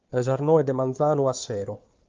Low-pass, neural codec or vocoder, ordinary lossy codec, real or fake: 7.2 kHz; codec, 16 kHz, 4 kbps, FunCodec, trained on Chinese and English, 50 frames a second; Opus, 32 kbps; fake